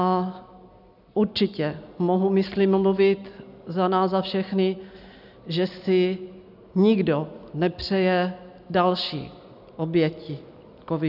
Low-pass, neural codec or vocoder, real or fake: 5.4 kHz; none; real